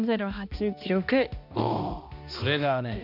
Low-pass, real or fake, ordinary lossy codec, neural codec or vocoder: 5.4 kHz; fake; none; codec, 16 kHz, 1 kbps, X-Codec, HuBERT features, trained on balanced general audio